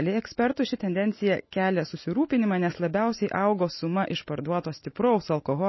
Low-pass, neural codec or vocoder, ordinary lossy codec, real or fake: 7.2 kHz; none; MP3, 24 kbps; real